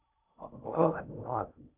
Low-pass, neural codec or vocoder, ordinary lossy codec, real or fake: 3.6 kHz; codec, 16 kHz in and 24 kHz out, 0.6 kbps, FocalCodec, streaming, 2048 codes; AAC, 24 kbps; fake